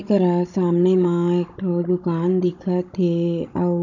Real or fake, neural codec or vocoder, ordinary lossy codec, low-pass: fake; codec, 16 kHz, 16 kbps, FunCodec, trained on Chinese and English, 50 frames a second; none; 7.2 kHz